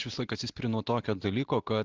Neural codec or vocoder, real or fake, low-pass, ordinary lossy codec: none; real; 7.2 kHz; Opus, 16 kbps